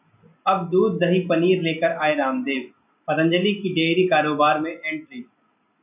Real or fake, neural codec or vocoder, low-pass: real; none; 3.6 kHz